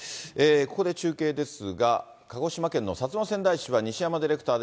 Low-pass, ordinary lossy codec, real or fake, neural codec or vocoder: none; none; real; none